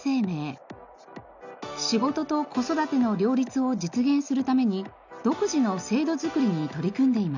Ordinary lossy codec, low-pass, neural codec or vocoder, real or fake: none; 7.2 kHz; none; real